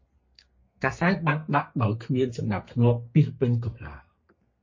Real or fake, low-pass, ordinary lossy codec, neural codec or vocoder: fake; 7.2 kHz; MP3, 32 kbps; codec, 32 kHz, 1.9 kbps, SNAC